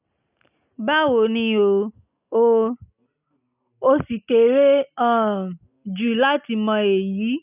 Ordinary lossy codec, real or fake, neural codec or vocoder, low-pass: none; real; none; 3.6 kHz